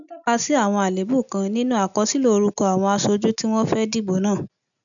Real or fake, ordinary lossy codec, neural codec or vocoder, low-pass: real; none; none; 7.2 kHz